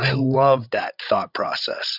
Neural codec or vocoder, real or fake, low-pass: codec, 16 kHz, 16 kbps, FreqCodec, larger model; fake; 5.4 kHz